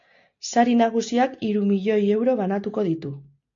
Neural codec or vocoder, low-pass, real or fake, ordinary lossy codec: none; 7.2 kHz; real; AAC, 48 kbps